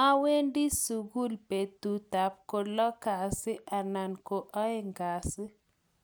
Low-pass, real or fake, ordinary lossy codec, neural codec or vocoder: none; real; none; none